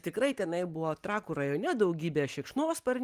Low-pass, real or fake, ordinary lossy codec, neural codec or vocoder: 14.4 kHz; real; Opus, 32 kbps; none